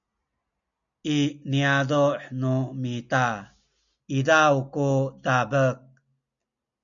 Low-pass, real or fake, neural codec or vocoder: 7.2 kHz; real; none